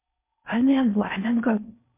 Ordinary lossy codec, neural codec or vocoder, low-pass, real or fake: MP3, 32 kbps; codec, 16 kHz in and 24 kHz out, 0.6 kbps, FocalCodec, streaming, 4096 codes; 3.6 kHz; fake